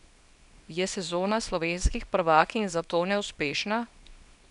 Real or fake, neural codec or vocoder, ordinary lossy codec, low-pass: fake; codec, 24 kHz, 0.9 kbps, WavTokenizer, small release; none; 10.8 kHz